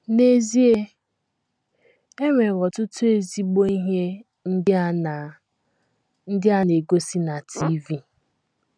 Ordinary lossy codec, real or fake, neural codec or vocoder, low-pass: none; real; none; 9.9 kHz